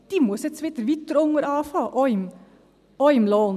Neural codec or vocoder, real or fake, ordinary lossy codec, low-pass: none; real; none; 14.4 kHz